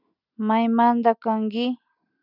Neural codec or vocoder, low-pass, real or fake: none; 5.4 kHz; real